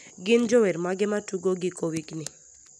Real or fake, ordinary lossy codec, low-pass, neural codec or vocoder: real; none; none; none